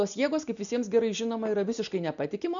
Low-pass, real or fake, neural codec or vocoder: 7.2 kHz; real; none